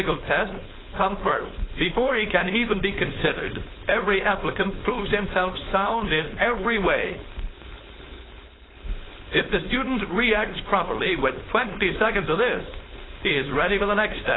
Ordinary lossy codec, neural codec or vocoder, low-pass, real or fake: AAC, 16 kbps; codec, 16 kHz, 4.8 kbps, FACodec; 7.2 kHz; fake